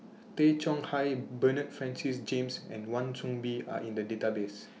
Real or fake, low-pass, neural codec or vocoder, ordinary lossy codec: real; none; none; none